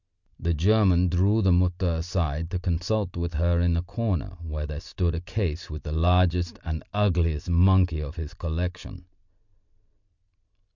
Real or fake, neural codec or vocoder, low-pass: real; none; 7.2 kHz